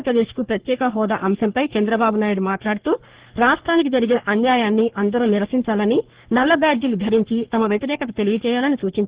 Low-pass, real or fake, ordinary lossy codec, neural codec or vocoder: 3.6 kHz; fake; Opus, 16 kbps; codec, 44.1 kHz, 3.4 kbps, Pupu-Codec